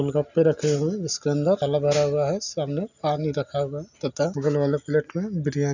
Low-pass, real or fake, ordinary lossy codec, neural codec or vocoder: 7.2 kHz; real; none; none